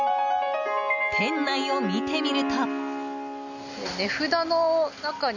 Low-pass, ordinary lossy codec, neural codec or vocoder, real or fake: 7.2 kHz; none; none; real